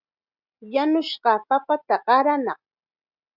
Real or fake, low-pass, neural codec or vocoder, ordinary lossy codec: real; 5.4 kHz; none; Opus, 64 kbps